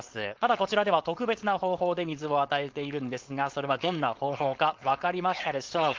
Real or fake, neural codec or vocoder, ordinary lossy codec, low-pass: fake; codec, 16 kHz, 4.8 kbps, FACodec; Opus, 32 kbps; 7.2 kHz